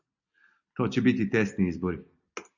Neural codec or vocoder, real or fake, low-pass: none; real; 7.2 kHz